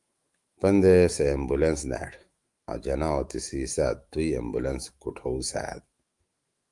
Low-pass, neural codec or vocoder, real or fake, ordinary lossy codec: 10.8 kHz; autoencoder, 48 kHz, 128 numbers a frame, DAC-VAE, trained on Japanese speech; fake; Opus, 32 kbps